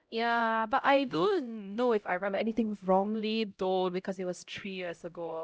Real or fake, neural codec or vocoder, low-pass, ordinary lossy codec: fake; codec, 16 kHz, 0.5 kbps, X-Codec, HuBERT features, trained on LibriSpeech; none; none